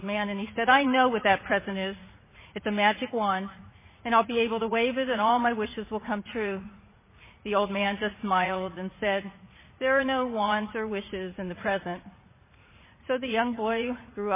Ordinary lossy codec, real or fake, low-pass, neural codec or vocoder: MP3, 16 kbps; fake; 3.6 kHz; vocoder, 44.1 kHz, 80 mel bands, Vocos